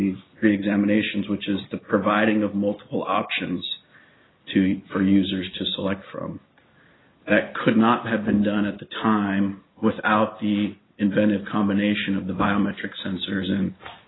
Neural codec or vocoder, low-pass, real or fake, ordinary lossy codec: none; 7.2 kHz; real; AAC, 16 kbps